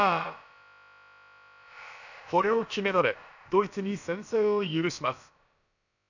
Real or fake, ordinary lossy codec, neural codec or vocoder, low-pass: fake; none; codec, 16 kHz, about 1 kbps, DyCAST, with the encoder's durations; 7.2 kHz